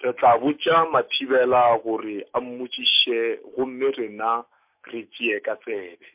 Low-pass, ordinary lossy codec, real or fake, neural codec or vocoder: 3.6 kHz; MP3, 32 kbps; fake; autoencoder, 48 kHz, 128 numbers a frame, DAC-VAE, trained on Japanese speech